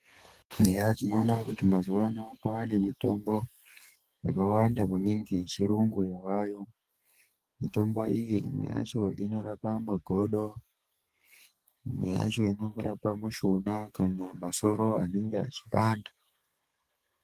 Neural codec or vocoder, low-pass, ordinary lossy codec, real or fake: codec, 32 kHz, 1.9 kbps, SNAC; 14.4 kHz; Opus, 32 kbps; fake